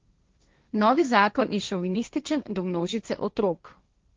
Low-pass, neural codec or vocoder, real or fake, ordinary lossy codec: 7.2 kHz; codec, 16 kHz, 1.1 kbps, Voila-Tokenizer; fake; Opus, 32 kbps